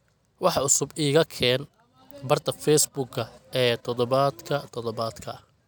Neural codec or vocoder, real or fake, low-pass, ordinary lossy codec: none; real; none; none